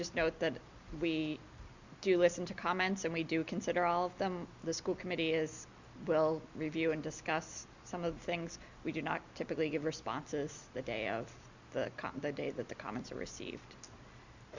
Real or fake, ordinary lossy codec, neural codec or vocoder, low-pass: real; Opus, 64 kbps; none; 7.2 kHz